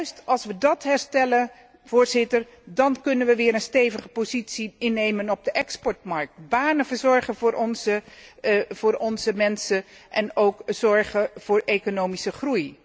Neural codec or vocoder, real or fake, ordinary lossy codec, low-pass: none; real; none; none